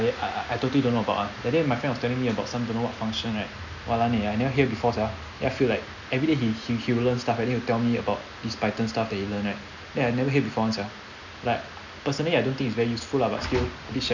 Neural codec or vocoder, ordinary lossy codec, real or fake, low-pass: none; none; real; 7.2 kHz